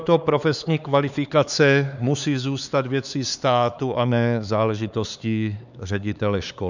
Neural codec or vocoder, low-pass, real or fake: codec, 16 kHz, 4 kbps, X-Codec, HuBERT features, trained on LibriSpeech; 7.2 kHz; fake